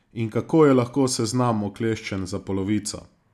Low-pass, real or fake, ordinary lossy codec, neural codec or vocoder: none; real; none; none